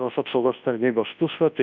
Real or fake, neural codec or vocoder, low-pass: fake; codec, 24 kHz, 0.9 kbps, WavTokenizer, large speech release; 7.2 kHz